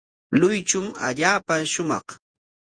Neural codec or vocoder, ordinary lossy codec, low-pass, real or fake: vocoder, 48 kHz, 128 mel bands, Vocos; Opus, 32 kbps; 9.9 kHz; fake